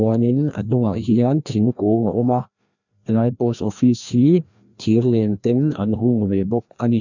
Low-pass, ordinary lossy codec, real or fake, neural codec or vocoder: 7.2 kHz; none; fake; codec, 16 kHz, 1 kbps, FreqCodec, larger model